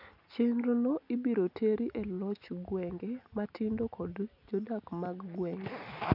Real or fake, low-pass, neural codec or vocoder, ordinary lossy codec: real; 5.4 kHz; none; none